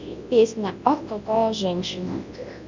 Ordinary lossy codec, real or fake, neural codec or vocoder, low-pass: MP3, 64 kbps; fake; codec, 24 kHz, 0.9 kbps, WavTokenizer, large speech release; 7.2 kHz